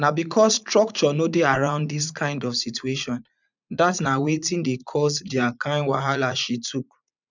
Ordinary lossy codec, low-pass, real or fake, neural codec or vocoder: none; 7.2 kHz; fake; vocoder, 44.1 kHz, 80 mel bands, Vocos